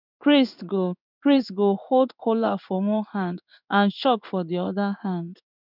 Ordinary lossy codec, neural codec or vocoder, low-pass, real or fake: none; codec, 16 kHz in and 24 kHz out, 1 kbps, XY-Tokenizer; 5.4 kHz; fake